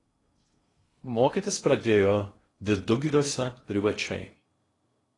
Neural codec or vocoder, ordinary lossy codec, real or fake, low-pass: codec, 16 kHz in and 24 kHz out, 0.6 kbps, FocalCodec, streaming, 2048 codes; AAC, 32 kbps; fake; 10.8 kHz